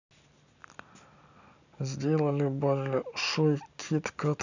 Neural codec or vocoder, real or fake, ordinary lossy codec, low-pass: none; real; none; 7.2 kHz